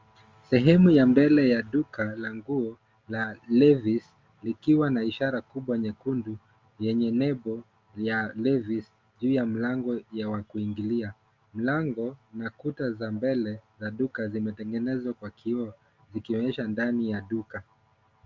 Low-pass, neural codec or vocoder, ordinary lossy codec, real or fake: 7.2 kHz; none; Opus, 32 kbps; real